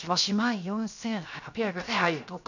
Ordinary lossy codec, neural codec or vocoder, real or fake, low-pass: none; codec, 16 kHz, 0.7 kbps, FocalCodec; fake; 7.2 kHz